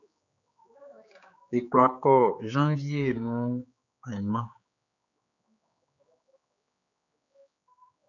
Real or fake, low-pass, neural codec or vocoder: fake; 7.2 kHz; codec, 16 kHz, 4 kbps, X-Codec, HuBERT features, trained on general audio